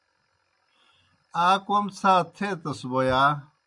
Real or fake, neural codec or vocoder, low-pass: real; none; 10.8 kHz